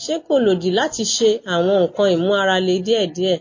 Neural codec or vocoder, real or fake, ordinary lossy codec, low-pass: none; real; MP3, 32 kbps; 7.2 kHz